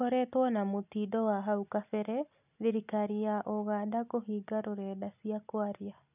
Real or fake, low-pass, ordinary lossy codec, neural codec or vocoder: real; 3.6 kHz; none; none